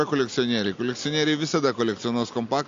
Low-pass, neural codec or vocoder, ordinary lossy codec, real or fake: 7.2 kHz; none; AAC, 48 kbps; real